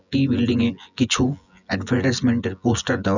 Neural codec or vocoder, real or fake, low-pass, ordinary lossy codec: vocoder, 24 kHz, 100 mel bands, Vocos; fake; 7.2 kHz; none